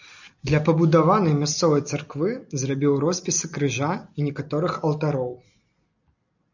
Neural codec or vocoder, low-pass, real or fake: none; 7.2 kHz; real